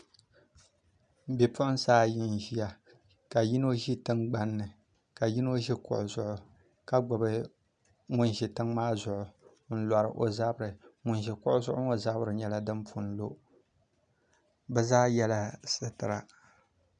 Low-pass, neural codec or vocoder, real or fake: 9.9 kHz; none; real